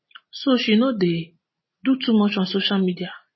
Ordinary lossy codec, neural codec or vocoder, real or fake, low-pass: MP3, 24 kbps; none; real; 7.2 kHz